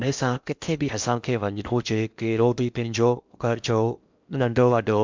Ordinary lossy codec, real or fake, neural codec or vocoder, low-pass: MP3, 64 kbps; fake; codec, 16 kHz in and 24 kHz out, 0.8 kbps, FocalCodec, streaming, 65536 codes; 7.2 kHz